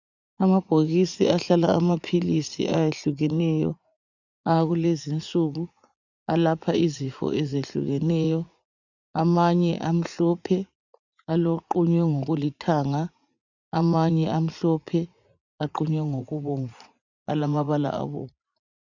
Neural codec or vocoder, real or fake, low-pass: vocoder, 44.1 kHz, 80 mel bands, Vocos; fake; 7.2 kHz